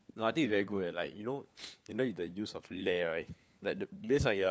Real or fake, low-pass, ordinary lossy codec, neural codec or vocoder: fake; none; none; codec, 16 kHz, 4 kbps, FunCodec, trained on LibriTTS, 50 frames a second